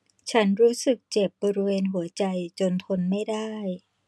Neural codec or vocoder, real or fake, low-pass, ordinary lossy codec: none; real; none; none